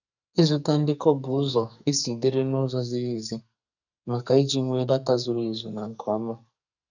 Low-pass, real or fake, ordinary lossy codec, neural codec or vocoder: 7.2 kHz; fake; none; codec, 44.1 kHz, 2.6 kbps, SNAC